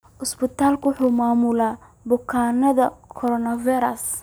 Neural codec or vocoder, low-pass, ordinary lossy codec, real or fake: none; none; none; real